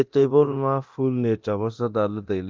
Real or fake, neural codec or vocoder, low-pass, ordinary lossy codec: fake; codec, 24 kHz, 0.9 kbps, DualCodec; 7.2 kHz; Opus, 32 kbps